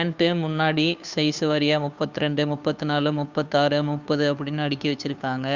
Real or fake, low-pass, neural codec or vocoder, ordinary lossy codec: fake; 7.2 kHz; codec, 16 kHz, 2 kbps, FunCodec, trained on Chinese and English, 25 frames a second; Opus, 64 kbps